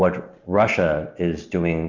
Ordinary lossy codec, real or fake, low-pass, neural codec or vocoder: Opus, 64 kbps; real; 7.2 kHz; none